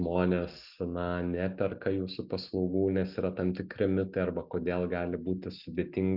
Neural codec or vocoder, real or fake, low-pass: none; real; 5.4 kHz